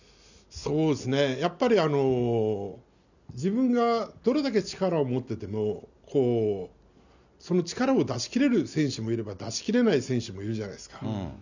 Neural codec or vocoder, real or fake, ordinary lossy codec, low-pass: none; real; none; 7.2 kHz